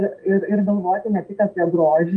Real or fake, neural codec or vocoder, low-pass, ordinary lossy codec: real; none; 10.8 kHz; Opus, 24 kbps